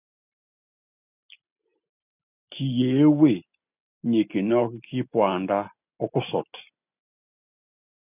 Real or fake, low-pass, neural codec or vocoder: real; 3.6 kHz; none